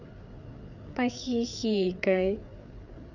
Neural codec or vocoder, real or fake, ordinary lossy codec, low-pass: codec, 16 kHz, 4 kbps, FreqCodec, larger model; fake; none; 7.2 kHz